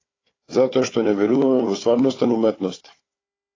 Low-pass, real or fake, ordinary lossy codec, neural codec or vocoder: 7.2 kHz; fake; AAC, 32 kbps; codec, 16 kHz, 4 kbps, FunCodec, trained on Chinese and English, 50 frames a second